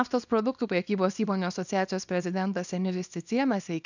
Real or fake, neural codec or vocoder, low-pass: fake; codec, 24 kHz, 0.9 kbps, WavTokenizer, small release; 7.2 kHz